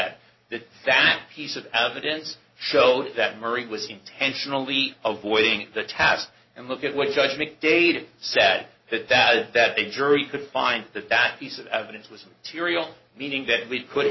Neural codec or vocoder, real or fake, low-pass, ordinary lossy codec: none; real; 7.2 kHz; MP3, 24 kbps